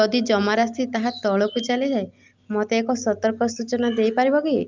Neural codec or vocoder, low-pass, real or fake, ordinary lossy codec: none; 7.2 kHz; real; Opus, 24 kbps